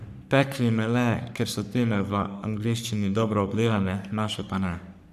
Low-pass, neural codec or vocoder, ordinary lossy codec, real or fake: 14.4 kHz; codec, 44.1 kHz, 3.4 kbps, Pupu-Codec; none; fake